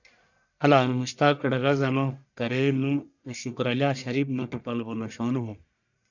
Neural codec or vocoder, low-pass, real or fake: codec, 44.1 kHz, 1.7 kbps, Pupu-Codec; 7.2 kHz; fake